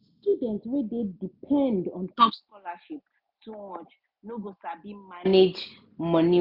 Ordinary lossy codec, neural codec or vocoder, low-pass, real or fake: none; none; 5.4 kHz; real